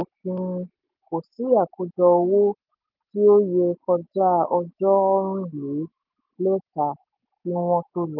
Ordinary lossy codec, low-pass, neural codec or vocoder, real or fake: Opus, 24 kbps; 5.4 kHz; none; real